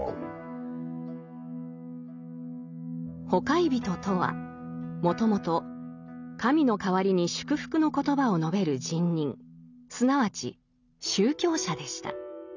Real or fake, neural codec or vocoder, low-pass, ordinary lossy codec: real; none; 7.2 kHz; none